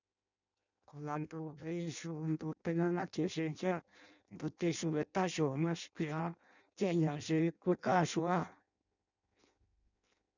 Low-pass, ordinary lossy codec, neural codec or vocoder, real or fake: 7.2 kHz; none; codec, 16 kHz in and 24 kHz out, 0.6 kbps, FireRedTTS-2 codec; fake